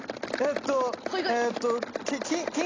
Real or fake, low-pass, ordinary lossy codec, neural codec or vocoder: real; 7.2 kHz; AAC, 32 kbps; none